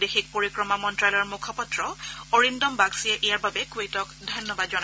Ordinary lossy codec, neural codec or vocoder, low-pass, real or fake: none; none; none; real